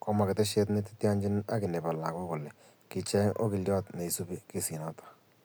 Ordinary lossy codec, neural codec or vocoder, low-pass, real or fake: none; none; none; real